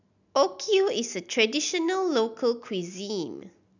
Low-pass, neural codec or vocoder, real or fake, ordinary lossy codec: 7.2 kHz; none; real; none